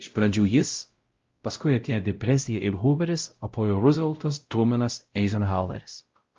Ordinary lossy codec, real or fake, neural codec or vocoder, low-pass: Opus, 32 kbps; fake; codec, 16 kHz, 0.5 kbps, X-Codec, WavLM features, trained on Multilingual LibriSpeech; 7.2 kHz